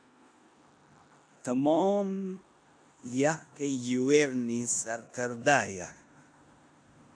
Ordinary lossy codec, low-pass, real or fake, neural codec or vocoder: AAC, 64 kbps; 9.9 kHz; fake; codec, 16 kHz in and 24 kHz out, 0.9 kbps, LongCat-Audio-Codec, four codebook decoder